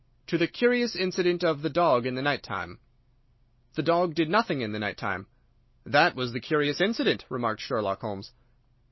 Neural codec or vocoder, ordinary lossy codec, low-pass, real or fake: none; MP3, 24 kbps; 7.2 kHz; real